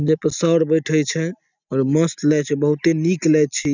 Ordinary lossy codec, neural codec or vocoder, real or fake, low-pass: none; none; real; 7.2 kHz